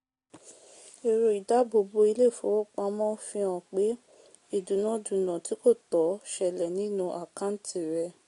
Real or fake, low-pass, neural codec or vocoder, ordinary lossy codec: real; 10.8 kHz; none; AAC, 48 kbps